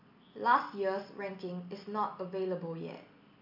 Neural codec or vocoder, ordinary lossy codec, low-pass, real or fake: none; MP3, 48 kbps; 5.4 kHz; real